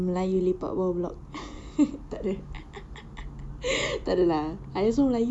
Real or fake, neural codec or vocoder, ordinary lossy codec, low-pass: real; none; none; none